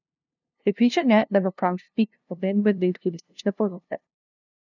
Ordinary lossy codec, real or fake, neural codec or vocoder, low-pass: none; fake; codec, 16 kHz, 0.5 kbps, FunCodec, trained on LibriTTS, 25 frames a second; 7.2 kHz